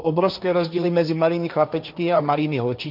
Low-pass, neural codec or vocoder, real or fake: 5.4 kHz; codec, 16 kHz, 1.1 kbps, Voila-Tokenizer; fake